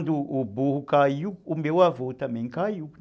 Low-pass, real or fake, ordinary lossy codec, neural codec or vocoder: none; real; none; none